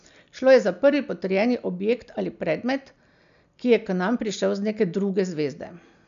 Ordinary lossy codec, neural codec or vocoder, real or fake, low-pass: none; none; real; 7.2 kHz